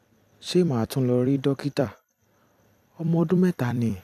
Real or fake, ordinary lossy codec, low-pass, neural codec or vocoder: fake; none; 14.4 kHz; vocoder, 44.1 kHz, 128 mel bands every 256 samples, BigVGAN v2